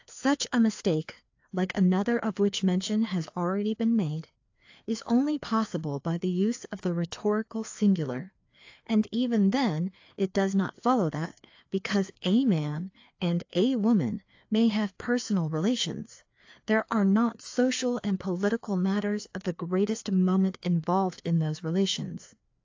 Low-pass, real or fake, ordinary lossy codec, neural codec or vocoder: 7.2 kHz; fake; AAC, 48 kbps; codec, 16 kHz, 2 kbps, FreqCodec, larger model